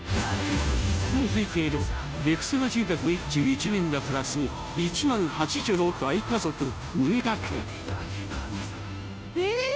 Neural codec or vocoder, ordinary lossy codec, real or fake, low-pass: codec, 16 kHz, 0.5 kbps, FunCodec, trained on Chinese and English, 25 frames a second; none; fake; none